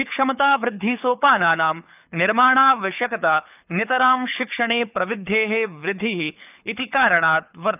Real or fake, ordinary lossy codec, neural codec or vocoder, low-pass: fake; none; codec, 24 kHz, 6 kbps, HILCodec; 3.6 kHz